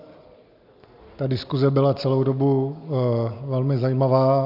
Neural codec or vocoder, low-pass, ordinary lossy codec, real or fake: none; 5.4 kHz; AAC, 48 kbps; real